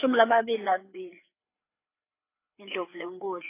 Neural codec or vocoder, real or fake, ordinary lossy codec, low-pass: codec, 16 kHz, 4 kbps, FreqCodec, larger model; fake; AAC, 24 kbps; 3.6 kHz